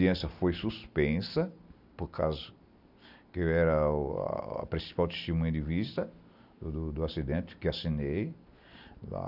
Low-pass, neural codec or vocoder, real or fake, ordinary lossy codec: 5.4 kHz; none; real; none